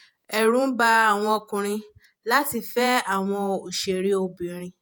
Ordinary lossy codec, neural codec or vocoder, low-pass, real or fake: none; vocoder, 48 kHz, 128 mel bands, Vocos; none; fake